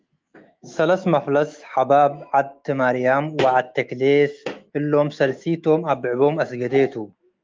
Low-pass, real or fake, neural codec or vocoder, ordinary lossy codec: 7.2 kHz; fake; autoencoder, 48 kHz, 128 numbers a frame, DAC-VAE, trained on Japanese speech; Opus, 32 kbps